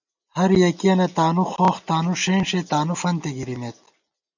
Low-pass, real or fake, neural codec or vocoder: 7.2 kHz; real; none